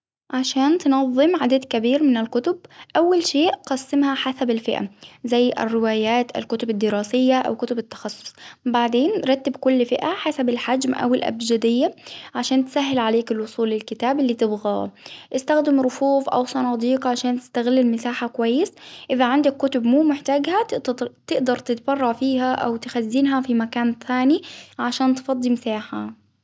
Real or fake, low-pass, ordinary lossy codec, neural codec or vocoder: real; none; none; none